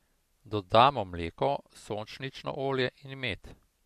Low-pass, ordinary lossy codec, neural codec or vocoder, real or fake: 14.4 kHz; MP3, 64 kbps; none; real